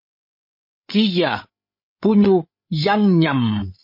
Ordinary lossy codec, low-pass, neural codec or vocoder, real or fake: MP3, 32 kbps; 5.4 kHz; codec, 16 kHz, 8 kbps, FreqCodec, larger model; fake